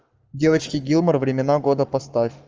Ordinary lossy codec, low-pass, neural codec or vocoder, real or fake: Opus, 24 kbps; 7.2 kHz; codec, 44.1 kHz, 7.8 kbps, Pupu-Codec; fake